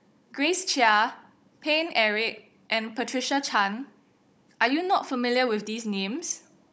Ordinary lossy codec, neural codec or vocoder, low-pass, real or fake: none; codec, 16 kHz, 16 kbps, FunCodec, trained on Chinese and English, 50 frames a second; none; fake